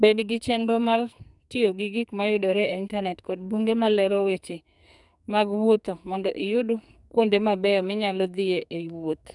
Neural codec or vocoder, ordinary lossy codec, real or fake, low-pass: codec, 44.1 kHz, 2.6 kbps, SNAC; none; fake; 10.8 kHz